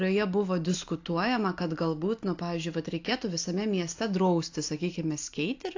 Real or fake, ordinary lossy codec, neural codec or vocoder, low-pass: real; AAC, 48 kbps; none; 7.2 kHz